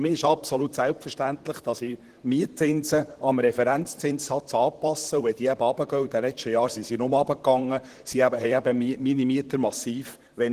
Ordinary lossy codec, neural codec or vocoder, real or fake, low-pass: Opus, 16 kbps; vocoder, 44.1 kHz, 128 mel bands, Pupu-Vocoder; fake; 14.4 kHz